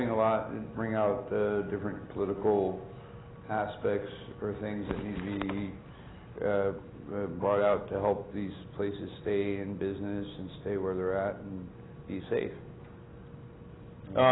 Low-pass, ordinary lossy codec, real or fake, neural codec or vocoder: 7.2 kHz; AAC, 16 kbps; real; none